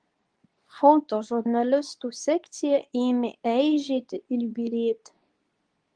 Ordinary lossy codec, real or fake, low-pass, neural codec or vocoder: Opus, 24 kbps; fake; 9.9 kHz; codec, 24 kHz, 0.9 kbps, WavTokenizer, medium speech release version 2